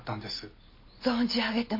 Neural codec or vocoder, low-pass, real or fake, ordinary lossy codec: none; 5.4 kHz; real; MP3, 32 kbps